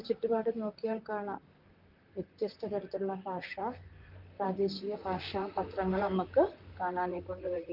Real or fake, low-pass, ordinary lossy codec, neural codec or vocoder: fake; 5.4 kHz; Opus, 32 kbps; vocoder, 44.1 kHz, 128 mel bands, Pupu-Vocoder